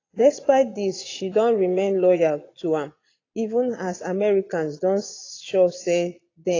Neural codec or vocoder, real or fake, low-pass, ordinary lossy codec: vocoder, 22.05 kHz, 80 mel bands, Vocos; fake; 7.2 kHz; AAC, 32 kbps